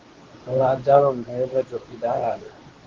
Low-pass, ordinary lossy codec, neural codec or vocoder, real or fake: 7.2 kHz; Opus, 16 kbps; codec, 24 kHz, 0.9 kbps, WavTokenizer, medium speech release version 2; fake